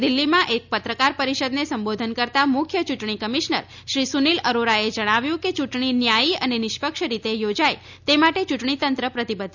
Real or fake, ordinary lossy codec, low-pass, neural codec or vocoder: real; none; 7.2 kHz; none